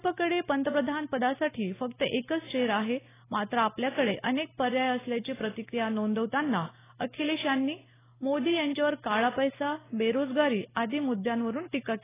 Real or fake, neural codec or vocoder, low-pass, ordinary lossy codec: real; none; 3.6 kHz; AAC, 16 kbps